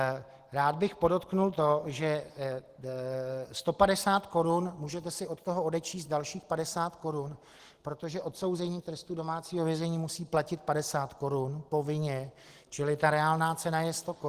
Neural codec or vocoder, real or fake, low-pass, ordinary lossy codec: none; real; 14.4 kHz; Opus, 16 kbps